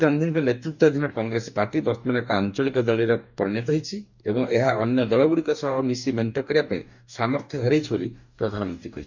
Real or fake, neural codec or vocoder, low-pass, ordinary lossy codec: fake; codec, 44.1 kHz, 2.6 kbps, DAC; 7.2 kHz; none